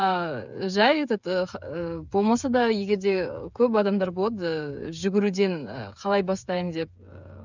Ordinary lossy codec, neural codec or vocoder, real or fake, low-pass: none; codec, 16 kHz, 8 kbps, FreqCodec, smaller model; fake; 7.2 kHz